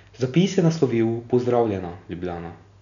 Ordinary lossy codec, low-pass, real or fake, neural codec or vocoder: none; 7.2 kHz; real; none